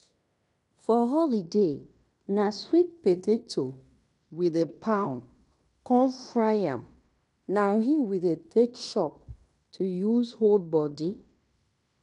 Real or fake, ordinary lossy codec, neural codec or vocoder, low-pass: fake; none; codec, 16 kHz in and 24 kHz out, 0.9 kbps, LongCat-Audio-Codec, fine tuned four codebook decoder; 10.8 kHz